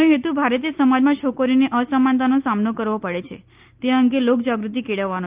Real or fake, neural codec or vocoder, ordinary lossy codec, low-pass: real; none; Opus, 24 kbps; 3.6 kHz